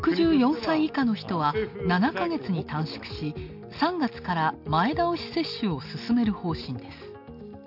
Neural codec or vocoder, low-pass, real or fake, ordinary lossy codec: none; 5.4 kHz; real; none